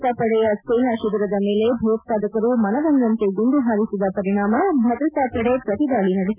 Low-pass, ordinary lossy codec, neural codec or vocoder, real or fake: 3.6 kHz; none; none; real